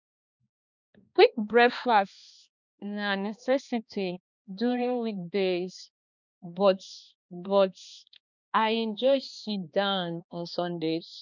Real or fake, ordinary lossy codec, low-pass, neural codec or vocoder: fake; none; 7.2 kHz; codec, 16 kHz, 2 kbps, X-Codec, HuBERT features, trained on balanced general audio